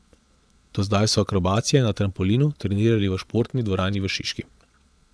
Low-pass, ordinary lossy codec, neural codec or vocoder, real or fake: none; none; vocoder, 22.05 kHz, 80 mel bands, Vocos; fake